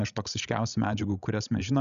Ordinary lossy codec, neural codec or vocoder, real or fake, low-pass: MP3, 96 kbps; codec, 16 kHz, 16 kbps, FreqCodec, larger model; fake; 7.2 kHz